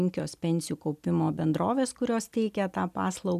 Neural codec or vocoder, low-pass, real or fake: none; 14.4 kHz; real